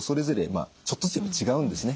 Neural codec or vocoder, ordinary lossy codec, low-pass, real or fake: none; none; none; real